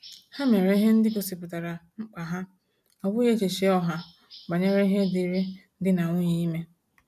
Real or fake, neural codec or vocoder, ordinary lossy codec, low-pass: real; none; none; 14.4 kHz